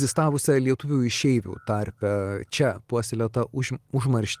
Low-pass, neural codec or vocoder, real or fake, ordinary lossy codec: 14.4 kHz; none; real; Opus, 24 kbps